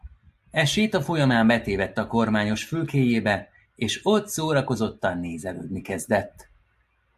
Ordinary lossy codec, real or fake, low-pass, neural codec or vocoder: AAC, 96 kbps; real; 14.4 kHz; none